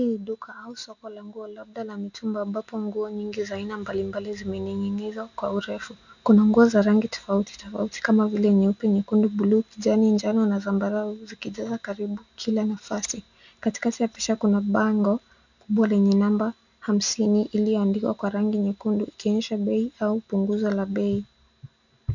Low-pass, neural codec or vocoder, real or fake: 7.2 kHz; none; real